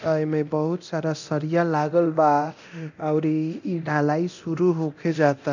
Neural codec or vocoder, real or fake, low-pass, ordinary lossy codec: codec, 24 kHz, 0.9 kbps, DualCodec; fake; 7.2 kHz; none